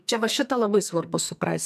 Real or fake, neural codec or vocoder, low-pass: fake; codec, 44.1 kHz, 2.6 kbps, SNAC; 14.4 kHz